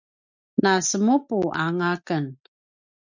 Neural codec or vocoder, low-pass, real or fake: none; 7.2 kHz; real